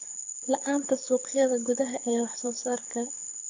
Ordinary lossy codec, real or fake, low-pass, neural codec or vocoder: AAC, 48 kbps; fake; 7.2 kHz; codec, 24 kHz, 6 kbps, HILCodec